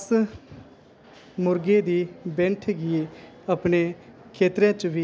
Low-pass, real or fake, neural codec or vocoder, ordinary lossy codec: none; real; none; none